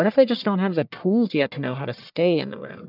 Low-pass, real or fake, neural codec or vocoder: 5.4 kHz; fake; codec, 44.1 kHz, 1.7 kbps, Pupu-Codec